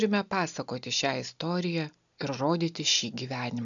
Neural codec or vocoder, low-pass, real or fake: none; 7.2 kHz; real